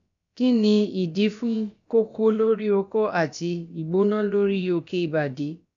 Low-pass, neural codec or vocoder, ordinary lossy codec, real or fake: 7.2 kHz; codec, 16 kHz, about 1 kbps, DyCAST, with the encoder's durations; none; fake